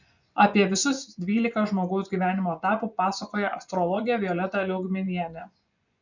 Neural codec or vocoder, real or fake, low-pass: vocoder, 24 kHz, 100 mel bands, Vocos; fake; 7.2 kHz